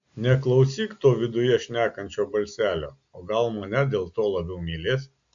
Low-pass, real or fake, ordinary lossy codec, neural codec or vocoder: 7.2 kHz; real; AAC, 64 kbps; none